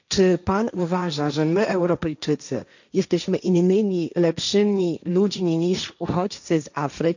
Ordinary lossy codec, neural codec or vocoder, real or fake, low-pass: none; codec, 16 kHz, 1.1 kbps, Voila-Tokenizer; fake; 7.2 kHz